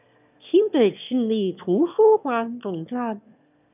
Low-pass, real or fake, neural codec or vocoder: 3.6 kHz; fake; autoencoder, 22.05 kHz, a latent of 192 numbers a frame, VITS, trained on one speaker